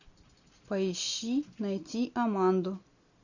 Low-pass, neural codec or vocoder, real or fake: 7.2 kHz; none; real